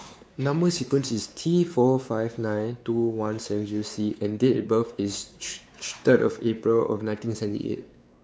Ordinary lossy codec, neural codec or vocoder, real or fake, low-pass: none; codec, 16 kHz, 4 kbps, X-Codec, WavLM features, trained on Multilingual LibriSpeech; fake; none